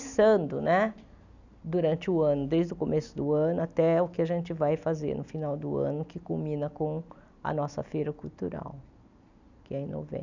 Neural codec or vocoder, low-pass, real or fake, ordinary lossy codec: none; 7.2 kHz; real; none